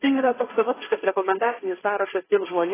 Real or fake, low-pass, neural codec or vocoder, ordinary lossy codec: fake; 3.6 kHz; codec, 16 kHz, 1.1 kbps, Voila-Tokenizer; AAC, 16 kbps